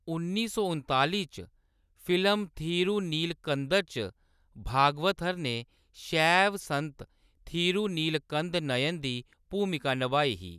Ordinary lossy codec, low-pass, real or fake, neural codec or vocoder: none; 14.4 kHz; real; none